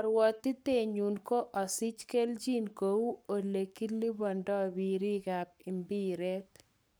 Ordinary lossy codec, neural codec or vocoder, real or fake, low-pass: none; codec, 44.1 kHz, 7.8 kbps, Pupu-Codec; fake; none